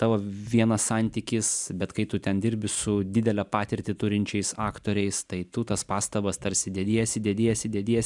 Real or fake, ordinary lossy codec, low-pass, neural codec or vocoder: real; MP3, 96 kbps; 10.8 kHz; none